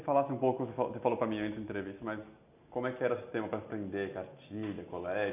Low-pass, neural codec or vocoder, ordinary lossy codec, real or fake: 3.6 kHz; none; none; real